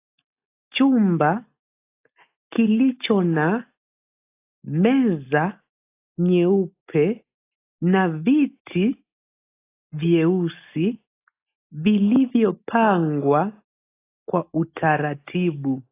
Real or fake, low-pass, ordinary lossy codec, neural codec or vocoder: real; 3.6 kHz; AAC, 24 kbps; none